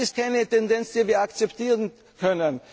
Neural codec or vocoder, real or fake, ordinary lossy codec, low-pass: none; real; none; none